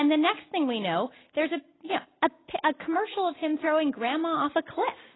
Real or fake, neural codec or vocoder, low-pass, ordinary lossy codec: real; none; 7.2 kHz; AAC, 16 kbps